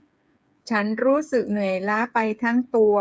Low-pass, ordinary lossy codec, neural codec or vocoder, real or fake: none; none; codec, 16 kHz, 8 kbps, FreqCodec, smaller model; fake